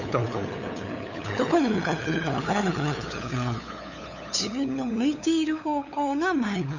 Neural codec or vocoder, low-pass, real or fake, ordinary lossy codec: codec, 16 kHz, 8 kbps, FunCodec, trained on LibriTTS, 25 frames a second; 7.2 kHz; fake; none